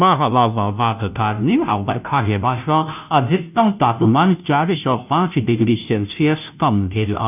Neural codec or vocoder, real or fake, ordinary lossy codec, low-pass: codec, 16 kHz, 0.5 kbps, FunCodec, trained on Chinese and English, 25 frames a second; fake; none; 3.6 kHz